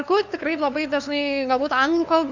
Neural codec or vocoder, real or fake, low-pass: codec, 16 kHz, 2 kbps, FunCodec, trained on LibriTTS, 25 frames a second; fake; 7.2 kHz